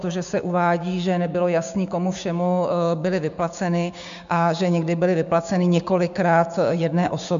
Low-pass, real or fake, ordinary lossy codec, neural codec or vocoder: 7.2 kHz; fake; MP3, 64 kbps; codec, 16 kHz, 6 kbps, DAC